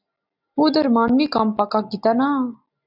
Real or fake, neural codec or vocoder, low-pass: real; none; 5.4 kHz